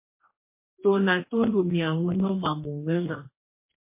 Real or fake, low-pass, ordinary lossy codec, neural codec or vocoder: fake; 3.6 kHz; MP3, 24 kbps; codec, 44.1 kHz, 2.6 kbps, DAC